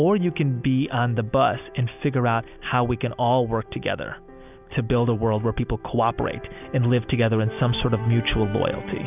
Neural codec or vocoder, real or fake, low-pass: none; real; 3.6 kHz